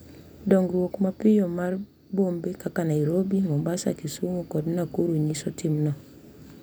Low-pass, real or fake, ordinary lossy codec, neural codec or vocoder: none; real; none; none